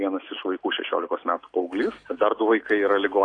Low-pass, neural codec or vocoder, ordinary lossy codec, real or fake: 9.9 kHz; none; AAC, 48 kbps; real